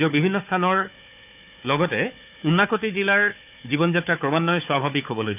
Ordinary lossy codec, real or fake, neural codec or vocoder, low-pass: none; fake; codec, 16 kHz, 4 kbps, FunCodec, trained on Chinese and English, 50 frames a second; 3.6 kHz